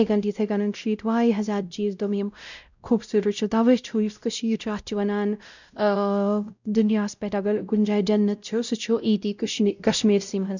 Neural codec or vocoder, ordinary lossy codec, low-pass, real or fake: codec, 16 kHz, 0.5 kbps, X-Codec, WavLM features, trained on Multilingual LibriSpeech; none; 7.2 kHz; fake